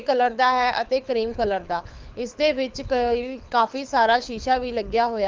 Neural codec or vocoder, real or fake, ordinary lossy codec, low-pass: codec, 24 kHz, 6 kbps, HILCodec; fake; Opus, 24 kbps; 7.2 kHz